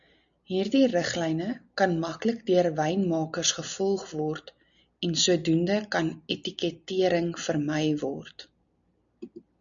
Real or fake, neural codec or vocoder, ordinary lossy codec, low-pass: real; none; MP3, 96 kbps; 7.2 kHz